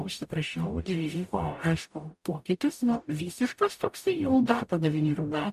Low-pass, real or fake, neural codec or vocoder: 14.4 kHz; fake; codec, 44.1 kHz, 0.9 kbps, DAC